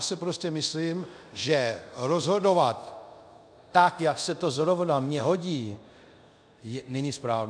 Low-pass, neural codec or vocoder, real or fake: 9.9 kHz; codec, 24 kHz, 0.5 kbps, DualCodec; fake